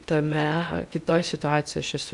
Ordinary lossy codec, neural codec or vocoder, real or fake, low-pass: MP3, 96 kbps; codec, 16 kHz in and 24 kHz out, 0.8 kbps, FocalCodec, streaming, 65536 codes; fake; 10.8 kHz